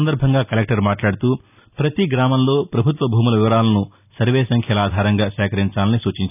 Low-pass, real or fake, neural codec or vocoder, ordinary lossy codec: 3.6 kHz; real; none; none